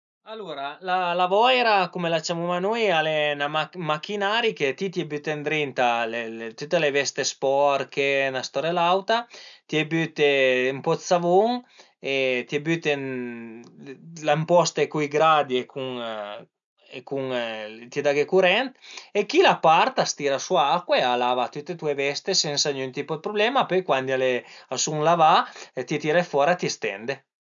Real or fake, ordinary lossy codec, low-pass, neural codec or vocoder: real; none; 7.2 kHz; none